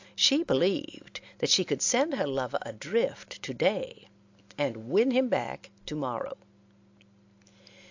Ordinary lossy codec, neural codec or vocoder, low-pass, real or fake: AAC, 48 kbps; none; 7.2 kHz; real